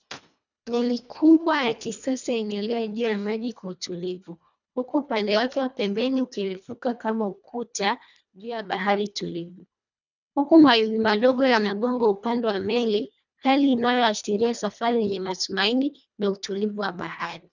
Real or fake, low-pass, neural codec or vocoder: fake; 7.2 kHz; codec, 24 kHz, 1.5 kbps, HILCodec